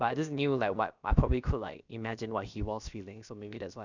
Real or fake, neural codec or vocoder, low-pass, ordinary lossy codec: fake; codec, 16 kHz, about 1 kbps, DyCAST, with the encoder's durations; 7.2 kHz; none